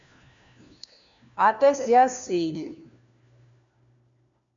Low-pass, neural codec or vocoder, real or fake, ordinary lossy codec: 7.2 kHz; codec, 16 kHz, 1 kbps, FunCodec, trained on LibriTTS, 50 frames a second; fake; MP3, 64 kbps